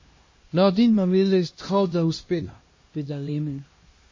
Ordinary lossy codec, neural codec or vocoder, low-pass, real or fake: MP3, 32 kbps; codec, 16 kHz, 1 kbps, X-Codec, HuBERT features, trained on LibriSpeech; 7.2 kHz; fake